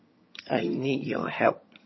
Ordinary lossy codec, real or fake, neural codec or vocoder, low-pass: MP3, 24 kbps; fake; vocoder, 22.05 kHz, 80 mel bands, HiFi-GAN; 7.2 kHz